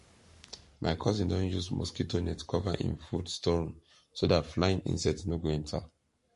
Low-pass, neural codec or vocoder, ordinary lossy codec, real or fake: 14.4 kHz; codec, 44.1 kHz, 7.8 kbps, Pupu-Codec; MP3, 48 kbps; fake